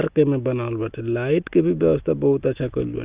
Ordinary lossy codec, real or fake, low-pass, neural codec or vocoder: Opus, 32 kbps; real; 3.6 kHz; none